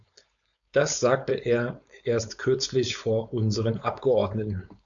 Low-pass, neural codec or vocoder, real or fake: 7.2 kHz; codec, 16 kHz, 4.8 kbps, FACodec; fake